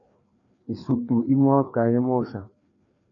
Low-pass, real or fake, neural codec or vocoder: 7.2 kHz; fake; codec, 16 kHz, 2 kbps, FreqCodec, larger model